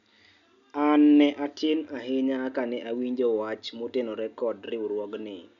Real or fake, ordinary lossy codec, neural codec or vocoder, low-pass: real; none; none; 7.2 kHz